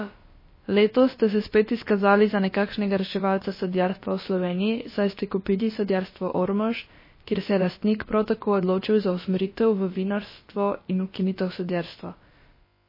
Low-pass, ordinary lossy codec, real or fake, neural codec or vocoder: 5.4 kHz; MP3, 24 kbps; fake; codec, 16 kHz, about 1 kbps, DyCAST, with the encoder's durations